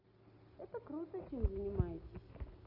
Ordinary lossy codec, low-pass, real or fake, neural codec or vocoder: none; 5.4 kHz; real; none